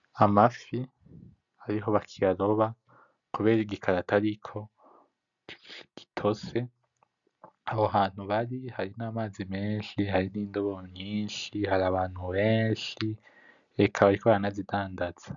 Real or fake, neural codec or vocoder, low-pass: real; none; 7.2 kHz